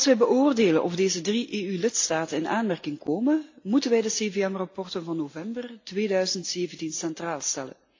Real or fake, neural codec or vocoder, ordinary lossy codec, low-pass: real; none; AAC, 48 kbps; 7.2 kHz